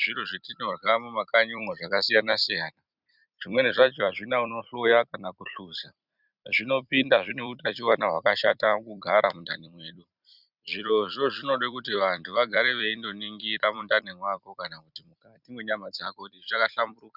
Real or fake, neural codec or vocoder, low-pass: fake; vocoder, 44.1 kHz, 128 mel bands every 256 samples, BigVGAN v2; 5.4 kHz